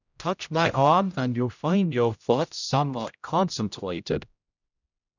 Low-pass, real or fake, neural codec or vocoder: 7.2 kHz; fake; codec, 16 kHz, 0.5 kbps, X-Codec, HuBERT features, trained on general audio